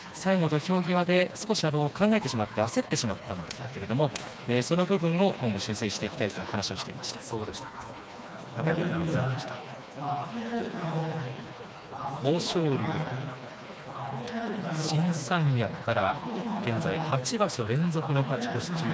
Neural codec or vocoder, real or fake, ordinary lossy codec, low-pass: codec, 16 kHz, 2 kbps, FreqCodec, smaller model; fake; none; none